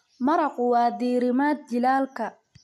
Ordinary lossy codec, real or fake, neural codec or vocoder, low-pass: MP3, 64 kbps; real; none; 14.4 kHz